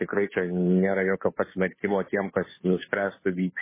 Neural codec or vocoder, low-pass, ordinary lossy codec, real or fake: codec, 16 kHz, 2 kbps, FunCodec, trained on Chinese and English, 25 frames a second; 3.6 kHz; MP3, 16 kbps; fake